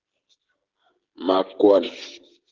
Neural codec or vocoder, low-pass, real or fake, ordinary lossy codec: codec, 16 kHz, 16 kbps, FreqCodec, smaller model; 7.2 kHz; fake; Opus, 16 kbps